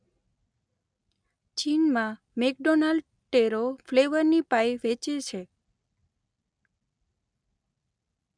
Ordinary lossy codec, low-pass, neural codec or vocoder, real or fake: none; 9.9 kHz; none; real